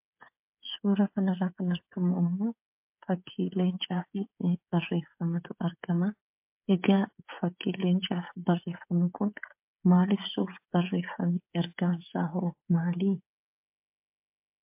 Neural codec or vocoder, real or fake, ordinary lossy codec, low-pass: codec, 16 kHz, 16 kbps, FreqCodec, smaller model; fake; MP3, 32 kbps; 3.6 kHz